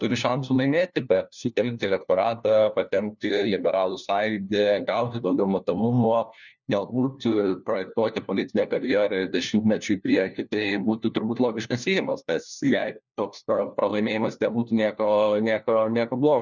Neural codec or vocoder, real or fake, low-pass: codec, 16 kHz, 1 kbps, FunCodec, trained on LibriTTS, 50 frames a second; fake; 7.2 kHz